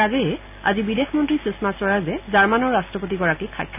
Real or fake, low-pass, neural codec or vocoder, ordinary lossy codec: real; 3.6 kHz; none; none